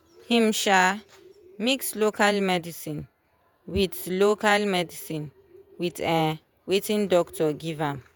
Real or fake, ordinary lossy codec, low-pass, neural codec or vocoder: fake; none; none; vocoder, 48 kHz, 128 mel bands, Vocos